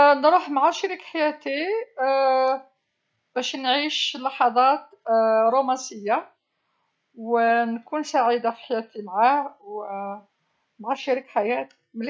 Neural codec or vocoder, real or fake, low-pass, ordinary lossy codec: none; real; none; none